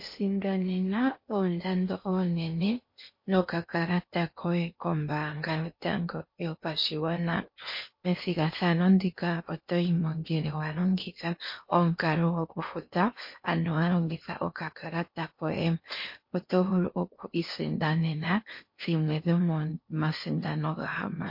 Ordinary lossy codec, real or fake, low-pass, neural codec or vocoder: MP3, 32 kbps; fake; 5.4 kHz; codec, 16 kHz in and 24 kHz out, 0.8 kbps, FocalCodec, streaming, 65536 codes